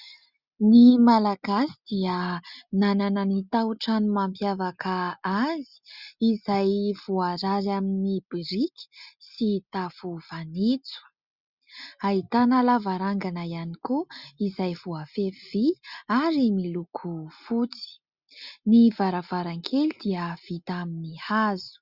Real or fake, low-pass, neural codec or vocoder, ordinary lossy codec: real; 5.4 kHz; none; Opus, 64 kbps